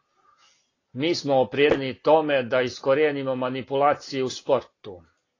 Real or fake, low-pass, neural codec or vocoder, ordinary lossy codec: real; 7.2 kHz; none; AAC, 32 kbps